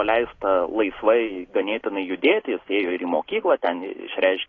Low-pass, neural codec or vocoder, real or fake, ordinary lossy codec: 7.2 kHz; none; real; AAC, 32 kbps